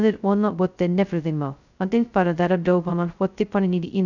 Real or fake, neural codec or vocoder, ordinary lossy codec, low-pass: fake; codec, 16 kHz, 0.2 kbps, FocalCodec; none; 7.2 kHz